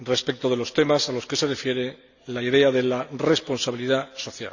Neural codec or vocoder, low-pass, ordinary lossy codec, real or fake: none; 7.2 kHz; none; real